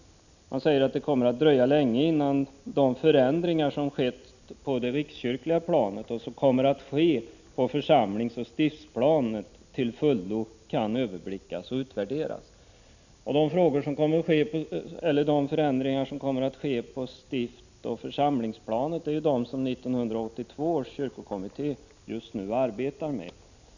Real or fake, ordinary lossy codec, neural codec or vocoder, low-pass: real; none; none; 7.2 kHz